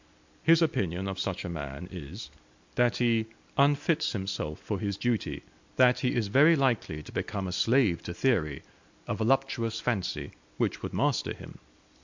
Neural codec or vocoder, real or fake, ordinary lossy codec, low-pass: none; real; MP3, 64 kbps; 7.2 kHz